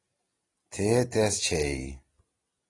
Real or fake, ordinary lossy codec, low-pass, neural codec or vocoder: real; AAC, 32 kbps; 10.8 kHz; none